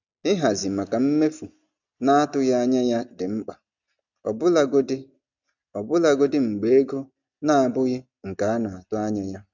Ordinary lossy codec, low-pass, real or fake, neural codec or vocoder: none; 7.2 kHz; real; none